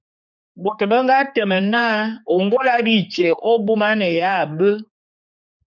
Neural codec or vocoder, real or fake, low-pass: codec, 16 kHz, 4 kbps, X-Codec, HuBERT features, trained on general audio; fake; 7.2 kHz